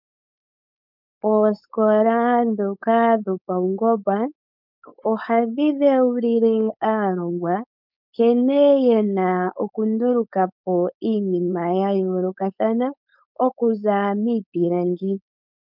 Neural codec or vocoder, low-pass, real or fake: codec, 16 kHz, 4.8 kbps, FACodec; 5.4 kHz; fake